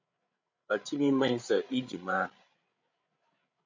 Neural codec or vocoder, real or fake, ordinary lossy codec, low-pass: codec, 16 kHz, 16 kbps, FreqCodec, larger model; fake; MP3, 64 kbps; 7.2 kHz